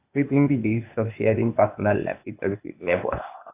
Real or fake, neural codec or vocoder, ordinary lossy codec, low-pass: fake; codec, 16 kHz, 0.8 kbps, ZipCodec; AAC, 32 kbps; 3.6 kHz